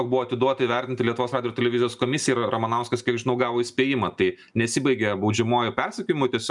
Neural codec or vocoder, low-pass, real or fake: none; 10.8 kHz; real